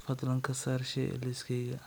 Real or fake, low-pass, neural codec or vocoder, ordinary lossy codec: real; none; none; none